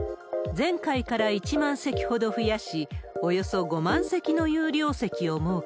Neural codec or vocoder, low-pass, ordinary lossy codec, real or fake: none; none; none; real